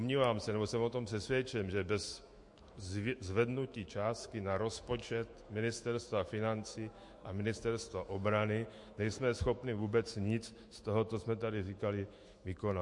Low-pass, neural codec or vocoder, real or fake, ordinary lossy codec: 10.8 kHz; autoencoder, 48 kHz, 128 numbers a frame, DAC-VAE, trained on Japanese speech; fake; MP3, 48 kbps